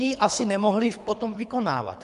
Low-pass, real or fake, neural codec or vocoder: 10.8 kHz; fake; codec, 24 kHz, 3 kbps, HILCodec